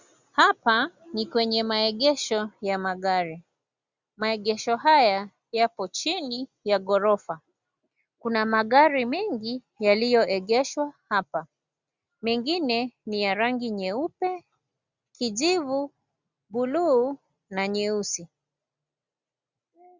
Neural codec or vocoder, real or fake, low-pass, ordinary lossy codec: none; real; 7.2 kHz; Opus, 64 kbps